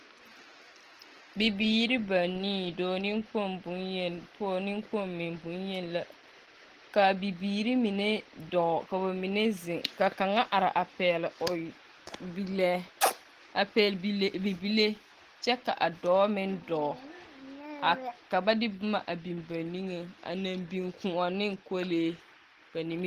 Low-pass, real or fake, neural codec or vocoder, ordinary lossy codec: 14.4 kHz; real; none; Opus, 16 kbps